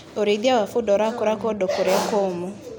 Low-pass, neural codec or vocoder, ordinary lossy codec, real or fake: none; none; none; real